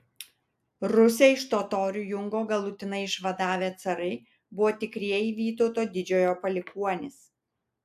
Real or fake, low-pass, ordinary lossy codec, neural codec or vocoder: real; 14.4 kHz; AAC, 96 kbps; none